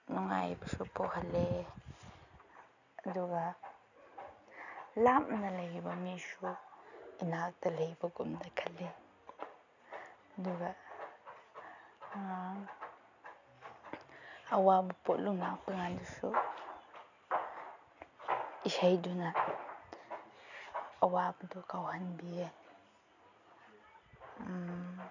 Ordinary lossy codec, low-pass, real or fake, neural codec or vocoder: none; 7.2 kHz; real; none